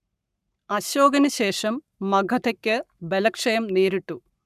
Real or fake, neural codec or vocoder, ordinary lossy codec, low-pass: fake; codec, 44.1 kHz, 7.8 kbps, Pupu-Codec; none; 14.4 kHz